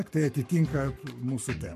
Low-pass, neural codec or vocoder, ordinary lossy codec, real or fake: 14.4 kHz; codec, 44.1 kHz, 7.8 kbps, Pupu-Codec; MP3, 64 kbps; fake